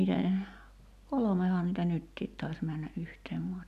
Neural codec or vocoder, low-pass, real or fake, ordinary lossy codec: none; 14.4 kHz; real; none